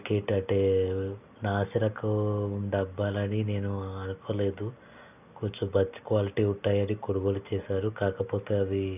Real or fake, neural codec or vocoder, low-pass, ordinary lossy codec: real; none; 3.6 kHz; none